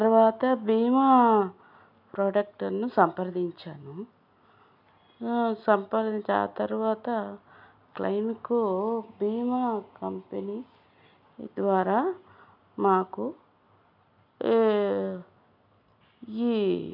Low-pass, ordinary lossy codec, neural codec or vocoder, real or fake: 5.4 kHz; none; none; real